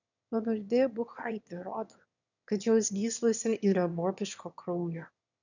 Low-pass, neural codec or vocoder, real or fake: 7.2 kHz; autoencoder, 22.05 kHz, a latent of 192 numbers a frame, VITS, trained on one speaker; fake